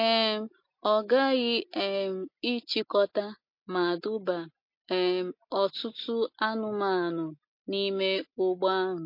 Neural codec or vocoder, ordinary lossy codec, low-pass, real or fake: none; MP3, 32 kbps; 5.4 kHz; real